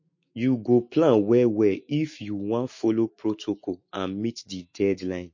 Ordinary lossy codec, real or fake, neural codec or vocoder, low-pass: MP3, 32 kbps; fake; autoencoder, 48 kHz, 128 numbers a frame, DAC-VAE, trained on Japanese speech; 7.2 kHz